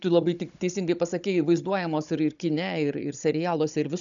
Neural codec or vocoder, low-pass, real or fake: codec, 16 kHz, 16 kbps, FunCodec, trained on Chinese and English, 50 frames a second; 7.2 kHz; fake